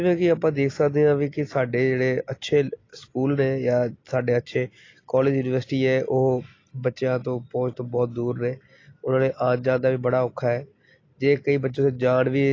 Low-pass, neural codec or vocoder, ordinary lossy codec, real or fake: 7.2 kHz; none; AAC, 32 kbps; real